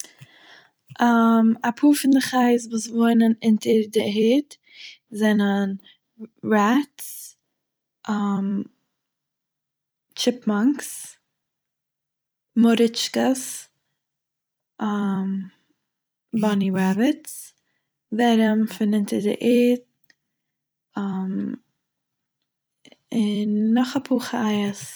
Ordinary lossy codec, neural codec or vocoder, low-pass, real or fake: none; vocoder, 44.1 kHz, 128 mel bands every 256 samples, BigVGAN v2; none; fake